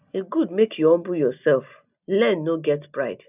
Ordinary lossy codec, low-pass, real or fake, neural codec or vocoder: none; 3.6 kHz; real; none